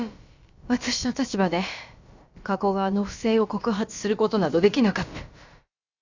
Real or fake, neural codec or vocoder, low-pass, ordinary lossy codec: fake; codec, 16 kHz, about 1 kbps, DyCAST, with the encoder's durations; 7.2 kHz; Opus, 64 kbps